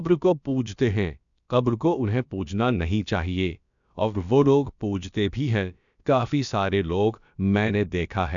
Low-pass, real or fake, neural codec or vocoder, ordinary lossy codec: 7.2 kHz; fake; codec, 16 kHz, about 1 kbps, DyCAST, with the encoder's durations; none